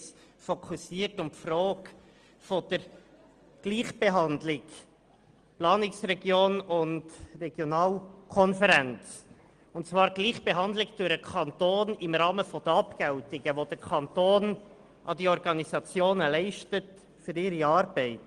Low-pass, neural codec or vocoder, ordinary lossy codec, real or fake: 9.9 kHz; none; Opus, 24 kbps; real